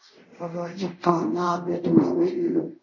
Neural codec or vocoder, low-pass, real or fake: codec, 24 kHz, 0.5 kbps, DualCodec; 7.2 kHz; fake